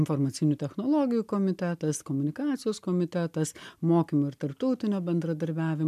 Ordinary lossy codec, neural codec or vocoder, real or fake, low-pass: MP3, 96 kbps; none; real; 14.4 kHz